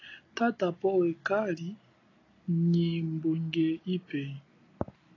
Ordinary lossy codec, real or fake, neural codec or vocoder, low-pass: AAC, 32 kbps; real; none; 7.2 kHz